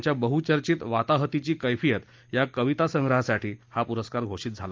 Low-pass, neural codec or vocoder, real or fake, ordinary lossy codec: 7.2 kHz; none; real; Opus, 32 kbps